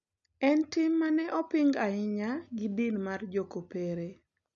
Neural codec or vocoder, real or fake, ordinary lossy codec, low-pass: none; real; none; 7.2 kHz